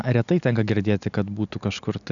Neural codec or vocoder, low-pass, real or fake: none; 7.2 kHz; real